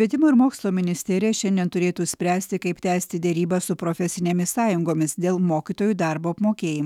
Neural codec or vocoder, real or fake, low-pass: autoencoder, 48 kHz, 128 numbers a frame, DAC-VAE, trained on Japanese speech; fake; 19.8 kHz